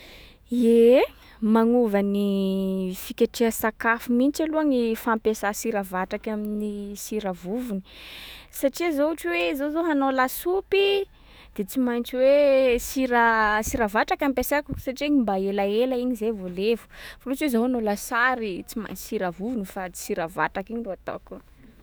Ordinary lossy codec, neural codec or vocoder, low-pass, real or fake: none; autoencoder, 48 kHz, 128 numbers a frame, DAC-VAE, trained on Japanese speech; none; fake